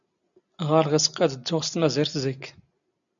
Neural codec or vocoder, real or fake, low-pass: none; real; 7.2 kHz